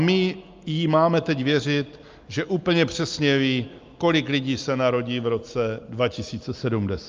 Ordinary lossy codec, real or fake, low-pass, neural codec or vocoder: Opus, 24 kbps; real; 7.2 kHz; none